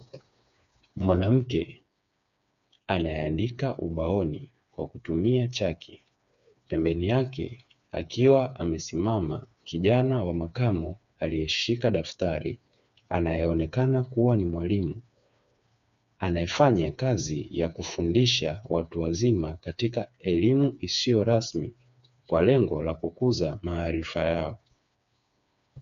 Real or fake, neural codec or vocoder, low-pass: fake; codec, 16 kHz, 4 kbps, FreqCodec, smaller model; 7.2 kHz